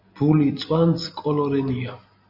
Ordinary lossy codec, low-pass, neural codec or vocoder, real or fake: AAC, 48 kbps; 5.4 kHz; none; real